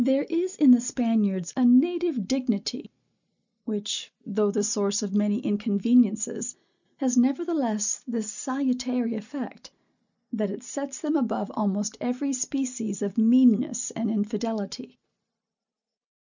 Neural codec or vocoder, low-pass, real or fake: none; 7.2 kHz; real